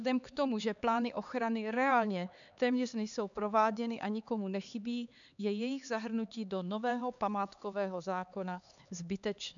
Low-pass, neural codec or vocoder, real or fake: 7.2 kHz; codec, 16 kHz, 4 kbps, X-Codec, HuBERT features, trained on LibriSpeech; fake